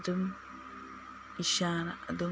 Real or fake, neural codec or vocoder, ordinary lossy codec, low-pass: real; none; none; none